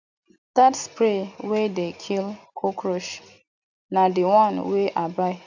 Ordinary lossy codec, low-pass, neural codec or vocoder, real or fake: none; 7.2 kHz; none; real